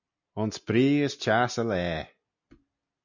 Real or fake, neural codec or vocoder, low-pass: real; none; 7.2 kHz